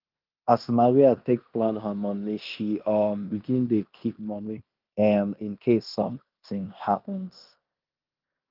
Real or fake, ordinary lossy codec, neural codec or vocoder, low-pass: fake; Opus, 32 kbps; codec, 16 kHz in and 24 kHz out, 0.9 kbps, LongCat-Audio-Codec, fine tuned four codebook decoder; 5.4 kHz